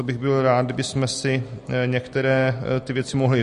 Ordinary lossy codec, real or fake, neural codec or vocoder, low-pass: MP3, 48 kbps; real; none; 14.4 kHz